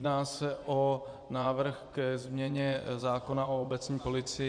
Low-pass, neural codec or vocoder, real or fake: 9.9 kHz; vocoder, 24 kHz, 100 mel bands, Vocos; fake